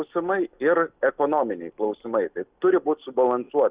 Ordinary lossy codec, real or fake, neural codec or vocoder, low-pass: Opus, 64 kbps; real; none; 3.6 kHz